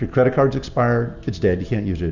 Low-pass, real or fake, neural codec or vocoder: 7.2 kHz; real; none